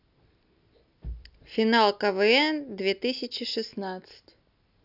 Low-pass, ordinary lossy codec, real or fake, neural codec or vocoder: 5.4 kHz; none; real; none